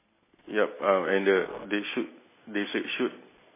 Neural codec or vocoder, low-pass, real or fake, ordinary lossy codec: none; 3.6 kHz; real; MP3, 16 kbps